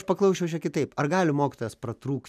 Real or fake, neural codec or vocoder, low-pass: real; none; 14.4 kHz